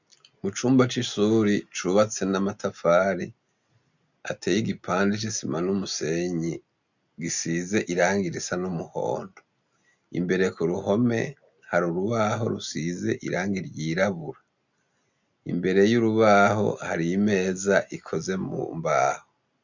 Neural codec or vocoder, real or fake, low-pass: none; real; 7.2 kHz